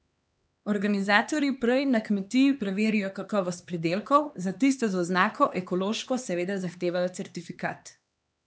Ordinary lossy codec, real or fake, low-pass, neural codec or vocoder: none; fake; none; codec, 16 kHz, 2 kbps, X-Codec, HuBERT features, trained on LibriSpeech